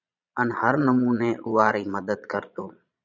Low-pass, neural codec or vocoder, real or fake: 7.2 kHz; vocoder, 22.05 kHz, 80 mel bands, Vocos; fake